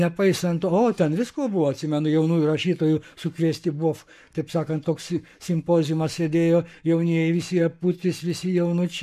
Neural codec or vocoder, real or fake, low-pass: codec, 44.1 kHz, 7.8 kbps, Pupu-Codec; fake; 14.4 kHz